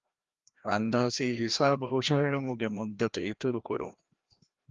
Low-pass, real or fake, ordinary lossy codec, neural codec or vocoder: 7.2 kHz; fake; Opus, 32 kbps; codec, 16 kHz, 1 kbps, FreqCodec, larger model